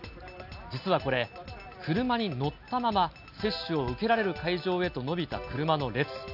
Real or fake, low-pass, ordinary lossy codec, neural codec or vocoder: real; 5.4 kHz; none; none